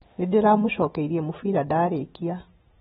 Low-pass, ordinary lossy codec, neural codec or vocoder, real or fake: 19.8 kHz; AAC, 16 kbps; none; real